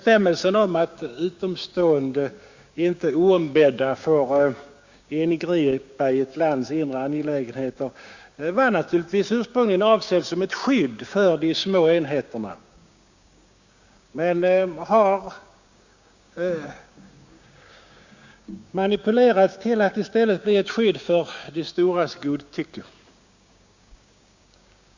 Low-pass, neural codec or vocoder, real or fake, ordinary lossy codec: 7.2 kHz; codec, 16 kHz, 6 kbps, DAC; fake; Opus, 64 kbps